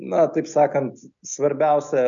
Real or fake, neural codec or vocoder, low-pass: real; none; 7.2 kHz